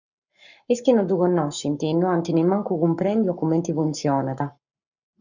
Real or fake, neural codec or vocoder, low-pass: fake; codec, 44.1 kHz, 7.8 kbps, Pupu-Codec; 7.2 kHz